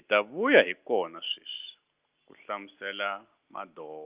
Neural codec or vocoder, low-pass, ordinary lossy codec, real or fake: none; 3.6 kHz; Opus, 32 kbps; real